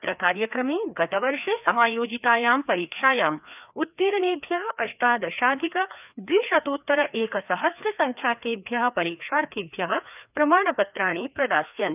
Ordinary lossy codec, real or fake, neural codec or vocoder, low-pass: none; fake; codec, 16 kHz, 2 kbps, FreqCodec, larger model; 3.6 kHz